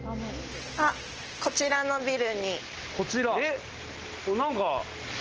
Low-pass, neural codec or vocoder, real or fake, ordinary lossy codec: 7.2 kHz; none; real; Opus, 16 kbps